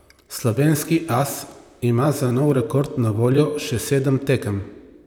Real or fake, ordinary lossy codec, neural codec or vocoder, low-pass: fake; none; vocoder, 44.1 kHz, 128 mel bands, Pupu-Vocoder; none